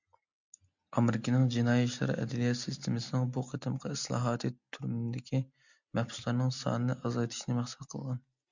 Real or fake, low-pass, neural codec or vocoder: real; 7.2 kHz; none